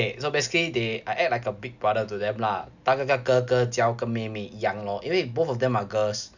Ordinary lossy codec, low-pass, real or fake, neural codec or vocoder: none; 7.2 kHz; real; none